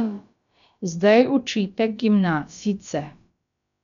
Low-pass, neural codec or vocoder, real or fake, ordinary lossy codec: 7.2 kHz; codec, 16 kHz, about 1 kbps, DyCAST, with the encoder's durations; fake; none